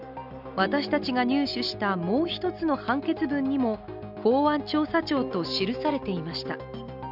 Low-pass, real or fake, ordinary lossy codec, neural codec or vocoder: 5.4 kHz; real; none; none